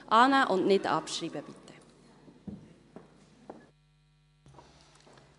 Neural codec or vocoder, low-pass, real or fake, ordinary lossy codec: none; 10.8 kHz; real; AAC, 96 kbps